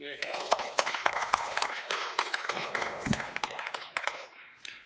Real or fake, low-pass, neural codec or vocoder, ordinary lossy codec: fake; none; codec, 16 kHz, 2 kbps, X-Codec, WavLM features, trained on Multilingual LibriSpeech; none